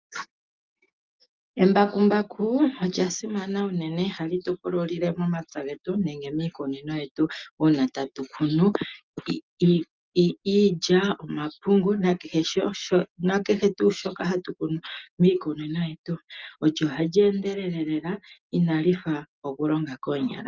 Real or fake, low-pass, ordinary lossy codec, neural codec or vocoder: real; 7.2 kHz; Opus, 24 kbps; none